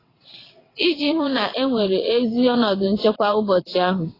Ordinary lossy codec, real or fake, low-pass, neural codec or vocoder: AAC, 24 kbps; fake; 5.4 kHz; vocoder, 22.05 kHz, 80 mel bands, WaveNeXt